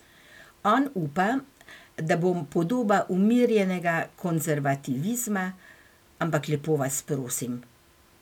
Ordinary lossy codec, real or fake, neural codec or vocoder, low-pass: none; real; none; 19.8 kHz